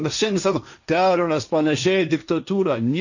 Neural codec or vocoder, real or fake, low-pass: codec, 16 kHz, 1.1 kbps, Voila-Tokenizer; fake; 7.2 kHz